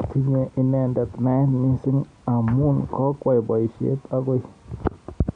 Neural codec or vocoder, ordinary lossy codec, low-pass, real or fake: none; MP3, 64 kbps; 9.9 kHz; real